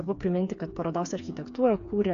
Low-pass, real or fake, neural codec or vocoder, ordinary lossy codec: 7.2 kHz; fake; codec, 16 kHz, 4 kbps, FreqCodec, smaller model; AAC, 64 kbps